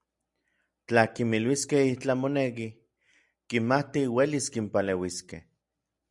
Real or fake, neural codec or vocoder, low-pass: real; none; 10.8 kHz